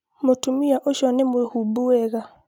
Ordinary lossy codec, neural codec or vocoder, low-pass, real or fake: none; none; 19.8 kHz; real